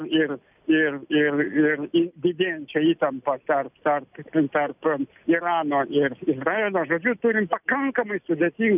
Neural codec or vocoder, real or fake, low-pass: none; real; 3.6 kHz